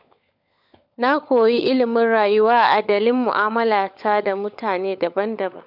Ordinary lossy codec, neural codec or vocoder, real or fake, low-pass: none; codec, 16 kHz, 16 kbps, FunCodec, trained on LibriTTS, 50 frames a second; fake; 5.4 kHz